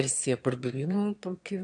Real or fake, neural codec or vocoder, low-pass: fake; autoencoder, 22.05 kHz, a latent of 192 numbers a frame, VITS, trained on one speaker; 9.9 kHz